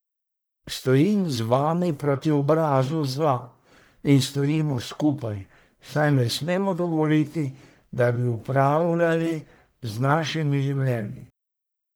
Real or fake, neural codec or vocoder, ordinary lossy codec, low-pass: fake; codec, 44.1 kHz, 1.7 kbps, Pupu-Codec; none; none